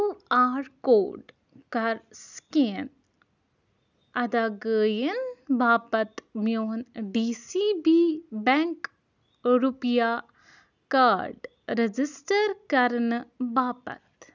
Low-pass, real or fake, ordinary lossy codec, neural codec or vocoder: 7.2 kHz; real; none; none